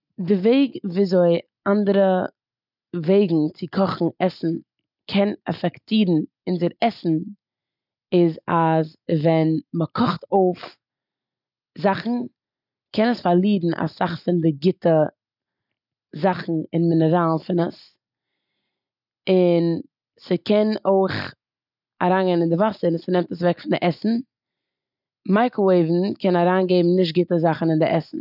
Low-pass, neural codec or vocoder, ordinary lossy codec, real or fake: 5.4 kHz; none; none; real